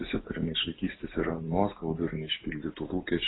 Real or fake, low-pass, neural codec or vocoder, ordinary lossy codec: real; 7.2 kHz; none; AAC, 16 kbps